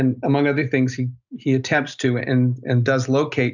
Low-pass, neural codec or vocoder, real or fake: 7.2 kHz; none; real